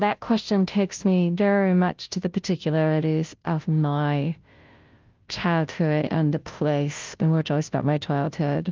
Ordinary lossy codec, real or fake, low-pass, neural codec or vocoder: Opus, 24 kbps; fake; 7.2 kHz; codec, 16 kHz, 0.5 kbps, FunCodec, trained on Chinese and English, 25 frames a second